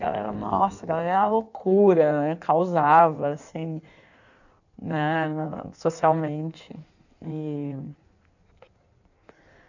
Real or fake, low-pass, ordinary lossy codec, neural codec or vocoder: fake; 7.2 kHz; none; codec, 16 kHz in and 24 kHz out, 1.1 kbps, FireRedTTS-2 codec